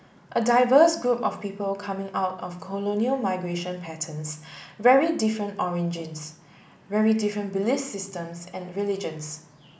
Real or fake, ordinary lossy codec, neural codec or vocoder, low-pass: real; none; none; none